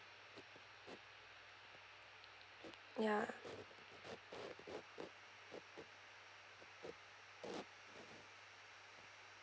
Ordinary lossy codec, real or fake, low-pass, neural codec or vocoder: none; real; none; none